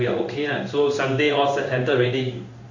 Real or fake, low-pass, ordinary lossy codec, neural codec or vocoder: fake; 7.2 kHz; AAC, 48 kbps; codec, 16 kHz in and 24 kHz out, 1 kbps, XY-Tokenizer